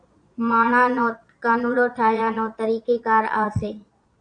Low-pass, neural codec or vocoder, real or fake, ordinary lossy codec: 9.9 kHz; vocoder, 22.05 kHz, 80 mel bands, Vocos; fake; AAC, 64 kbps